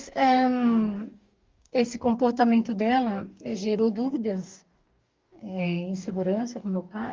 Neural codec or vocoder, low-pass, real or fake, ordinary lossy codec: codec, 44.1 kHz, 2.6 kbps, DAC; 7.2 kHz; fake; Opus, 32 kbps